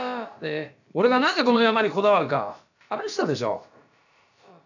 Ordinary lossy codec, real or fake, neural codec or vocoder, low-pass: none; fake; codec, 16 kHz, about 1 kbps, DyCAST, with the encoder's durations; 7.2 kHz